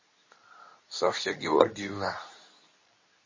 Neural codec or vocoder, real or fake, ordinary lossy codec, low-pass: codec, 24 kHz, 0.9 kbps, WavTokenizer, medium speech release version 2; fake; MP3, 32 kbps; 7.2 kHz